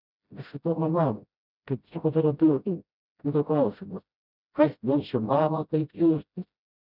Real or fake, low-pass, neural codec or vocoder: fake; 5.4 kHz; codec, 16 kHz, 0.5 kbps, FreqCodec, smaller model